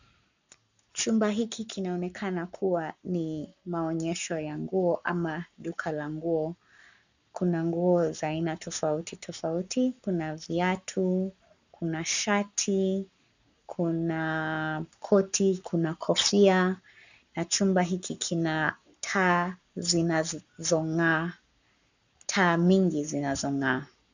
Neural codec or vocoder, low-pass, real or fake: codec, 44.1 kHz, 7.8 kbps, Pupu-Codec; 7.2 kHz; fake